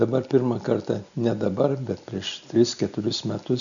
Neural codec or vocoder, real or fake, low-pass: none; real; 7.2 kHz